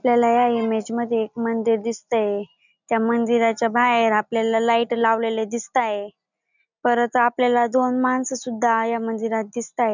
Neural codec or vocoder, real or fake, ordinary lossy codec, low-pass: none; real; none; 7.2 kHz